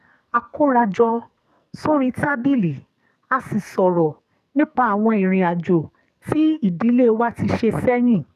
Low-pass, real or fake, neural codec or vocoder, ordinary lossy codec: 14.4 kHz; fake; codec, 44.1 kHz, 2.6 kbps, SNAC; none